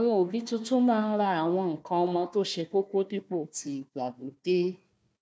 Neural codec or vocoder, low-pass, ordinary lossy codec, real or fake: codec, 16 kHz, 1 kbps, FunCodec, trained on Chinese and English, 50 frames a second; none; none; fake